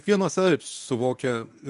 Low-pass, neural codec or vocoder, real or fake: 10.8 kHz; codec, 24 kHz, 0.9 kbps, WavTokenizer, medium speech release version 1; fake